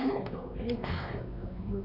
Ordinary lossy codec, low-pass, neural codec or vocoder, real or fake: none; 5.4 kHz; codec, 16 kHz, 2 kbps, X-Codec, WavLM features, trained on Multilingual LibriSpeech; fake